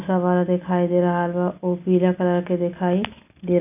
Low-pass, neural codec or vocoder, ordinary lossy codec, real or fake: 3.6 kHz; none; none; real